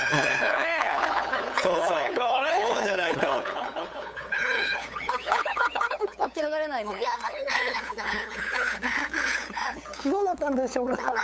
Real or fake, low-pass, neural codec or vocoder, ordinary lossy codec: fake; none; codec, 16 kHz, 8 kbps, FunCodec, trained on LibriTTS, 25 frames a second; none